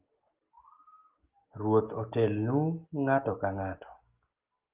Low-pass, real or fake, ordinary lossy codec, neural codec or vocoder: 3.6 kHz; real; Opus, 32 kbps; none